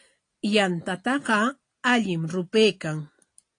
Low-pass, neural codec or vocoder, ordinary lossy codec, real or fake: 9.9 kHz; none; AAC, 48 kbps; real